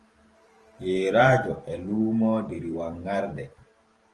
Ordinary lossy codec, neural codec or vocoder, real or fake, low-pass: Opus, 24 kbps; vocoder, 44.1 kHz, 128 mel bands every 512 samples, BigVGAN v2; fake; 10.8 kHz